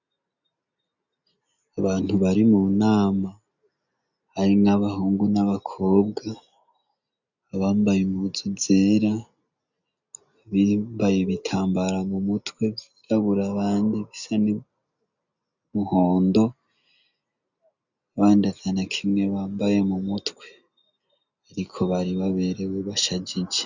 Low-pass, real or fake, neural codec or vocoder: 7.2 kHz; real; none